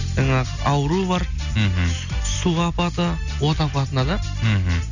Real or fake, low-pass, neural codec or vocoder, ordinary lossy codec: real; 7.2 kHz; none; none